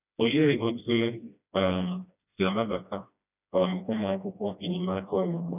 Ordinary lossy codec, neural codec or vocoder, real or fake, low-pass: none; codec, 16 kHz, 1 kbps, FreqCodec, smaller model; fake; 3.6 kHz